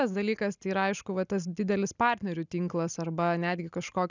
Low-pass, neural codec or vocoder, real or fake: 7.2 kHz; none; real